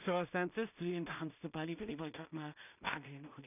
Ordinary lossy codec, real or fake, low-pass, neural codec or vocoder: none; fake; 3.6 kHz; codec, 16 kHz in and 24 kHz out, 0.4 kbps, LongCat-Audio-Codec, two codebook decoder